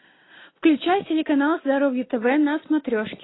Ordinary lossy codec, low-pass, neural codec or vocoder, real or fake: AAC, 16 kbps; 7.2 kHz; none; real